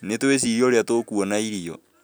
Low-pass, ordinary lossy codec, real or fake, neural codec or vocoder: none; none; real; none